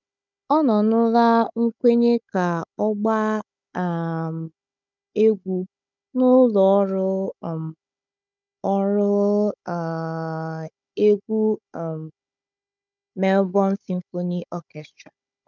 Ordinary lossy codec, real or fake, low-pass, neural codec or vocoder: none; fake; 7.2 kHz; codec, 16 kHz, 16 kbps, FunCodec, trained on Chinese and English, 50 frames a second